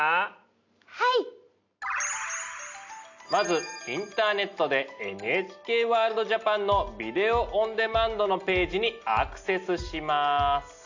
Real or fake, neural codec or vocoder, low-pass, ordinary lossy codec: real; none; 7.2 kHz; AAC, 48 kbps